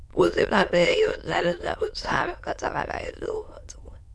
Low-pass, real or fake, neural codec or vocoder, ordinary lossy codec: none; fake; autoencoder, 22.05 kHz, a latent of 192 numbers a frame, VITS, trained on many speakers; none